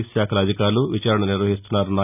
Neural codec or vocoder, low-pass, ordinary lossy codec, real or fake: none; 3.6 kHz; none; real